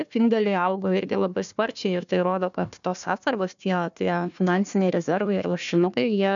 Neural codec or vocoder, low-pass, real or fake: codec, 16 kHz, 1 kbps, FunCodec, trained on Chinese and English, 50 frames a second; 7.2 kHz; fake